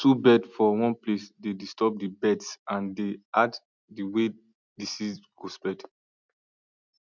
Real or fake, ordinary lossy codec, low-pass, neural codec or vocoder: real; none; 7.2 kHz; none